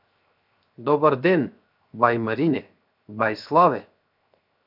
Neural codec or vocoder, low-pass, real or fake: codec, 16 kHz, 0.7 kbps, FocalCodec; 5.4 kHz; fake